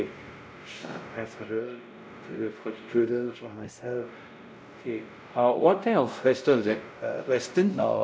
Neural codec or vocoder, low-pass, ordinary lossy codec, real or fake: codec, 16 kHz, 0.5 kbps, X-Codec, WavLM features, trained on Multilingual LibriSpeech; none; none; fake